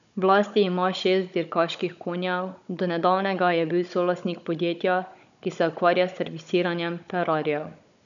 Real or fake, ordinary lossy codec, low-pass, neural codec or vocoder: fake; none; 7.2 kHz; codec, 16 kHz, 16 kbps, FunCodec, trained on Chinese and English, 50 frames a second